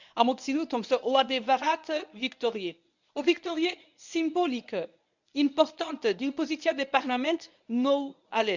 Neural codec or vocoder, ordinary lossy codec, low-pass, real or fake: codec, 24 kHz, 0.9 kbps, WavTokenizer, medium speech release version 1; none; 7.2 kHz; fake